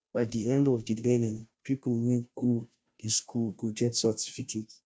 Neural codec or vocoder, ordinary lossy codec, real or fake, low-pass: codec, 16 kHz, 0.5 kbps, FunCodec, trained on Chinese and English, 25 frames a second; none; fake; none